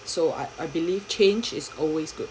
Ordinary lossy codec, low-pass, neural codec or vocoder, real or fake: none; none; none; real